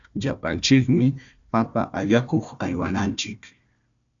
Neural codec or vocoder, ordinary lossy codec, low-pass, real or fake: codec, 16 kHz, 1 kbps, FunCodec, trained on Chinese and English, 50 frames a second; MP3, 96 kbps; 7.2 kHz; fake